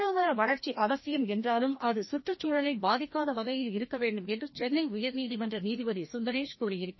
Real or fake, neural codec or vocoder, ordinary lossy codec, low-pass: fake; codec, 16 kHz, 1 kbps, FreqCodec, larger model; MP3, 24 kbps; 7.2 kHz